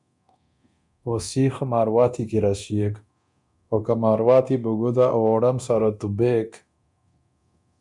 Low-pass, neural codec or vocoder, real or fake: 10.8 kHz; codec, 24 kHz, 0.9 kbps, DualCodec; fake